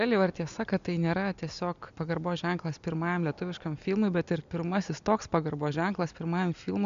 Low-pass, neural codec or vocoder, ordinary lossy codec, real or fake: 7.2 kHz; none; MP3, 64 kbps; real